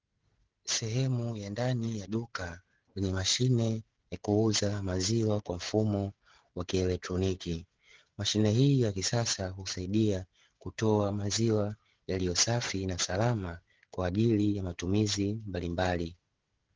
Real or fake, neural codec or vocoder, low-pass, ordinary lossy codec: fake; codec, 16 kHz, 16 kbps, FreqCodec, smaller model; 7.2 kHz; Opus, 16 kbps